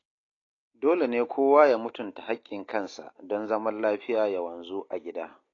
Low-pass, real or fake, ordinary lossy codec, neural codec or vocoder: 7.2 kHz; real; AAC, 32 kbps; none